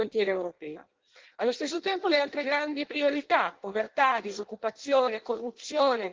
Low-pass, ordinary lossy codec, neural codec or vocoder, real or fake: 7.2 kHz; Opus, 16 kbps; codec, 16 kHz in and 24 kHz out, 0.6 kbps, FireRedTTS-2 codec; fake